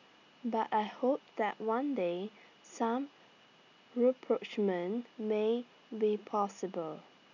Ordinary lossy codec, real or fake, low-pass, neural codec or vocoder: none; real; 7.2 kHz; none